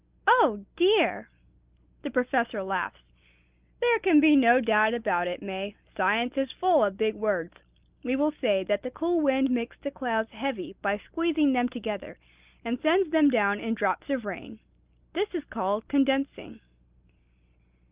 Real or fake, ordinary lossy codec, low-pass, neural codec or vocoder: real; Opus, 32 kbps; 3.6 kHz; none